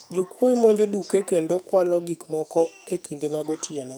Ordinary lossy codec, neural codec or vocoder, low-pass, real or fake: none; codec, 44.1 kHz, 2.6 kbps, SNAC; none; fake